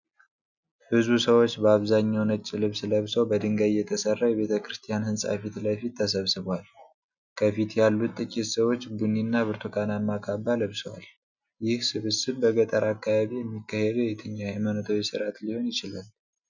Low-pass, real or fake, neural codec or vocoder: 7.2 kHz; real; none